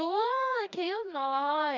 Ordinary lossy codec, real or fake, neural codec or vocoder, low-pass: none; fake; codec, 16 kHz, 1 kbps, FreqCodec, larger model; 7.2 kHz